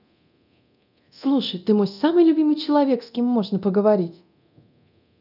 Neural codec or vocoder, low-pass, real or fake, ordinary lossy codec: codec, 24 kHz, 0.9 kbps, DualCodec; 5.4 kHz; fake; none